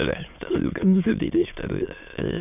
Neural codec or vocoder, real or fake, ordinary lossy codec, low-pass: autoencoder, 22.05 kHz, a latent of 192 numbers a frame, VITS, trained on many speakers; fake; none; 3.6 kHz